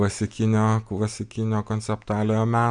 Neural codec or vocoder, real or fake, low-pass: none; real; 9.9 kHz